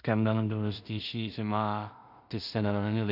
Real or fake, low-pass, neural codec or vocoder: fake; 5.4 kHz; codec, 16 kHz in and 24 kHz out, 0.4 kbps, LongCat-Audio-Codec, two codebook decoder